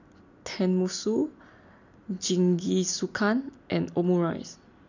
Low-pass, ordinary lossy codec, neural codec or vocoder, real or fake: 7.2 kHz; none; none; real